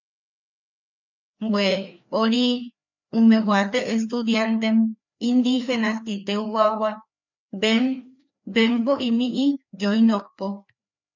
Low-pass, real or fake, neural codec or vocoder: 7.2 kHz; fake; codec, 16 kHz, 2 kbps, FreqCodec, larger model